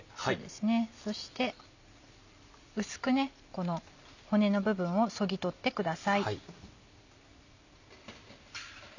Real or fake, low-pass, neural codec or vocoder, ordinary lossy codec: real; 7.2 kHz; none; none